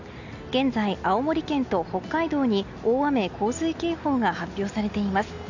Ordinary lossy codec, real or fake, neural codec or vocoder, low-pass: none; real; none; 7.2 kHz